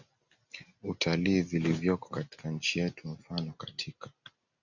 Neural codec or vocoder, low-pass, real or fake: none; 7.2 kHz; real